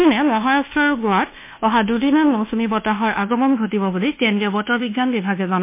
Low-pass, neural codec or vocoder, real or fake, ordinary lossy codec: 3.6 kHz; codec, 24 kHz, 1.2 kbps, DualCodec; fake; MP3, 24 kbps